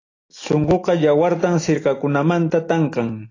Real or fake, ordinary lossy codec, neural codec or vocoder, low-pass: real; AAC, 32 kbps; none; 7.2 kHz